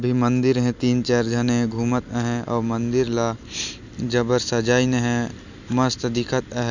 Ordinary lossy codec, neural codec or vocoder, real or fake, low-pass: none; none; real; 7.2 kHz